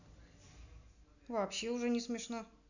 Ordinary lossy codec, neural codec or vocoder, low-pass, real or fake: none; none; 7.2 kHz; real